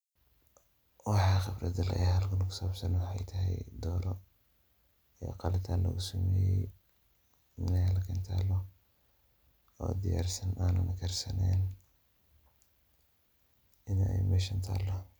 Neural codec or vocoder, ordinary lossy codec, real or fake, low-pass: none; none; real; none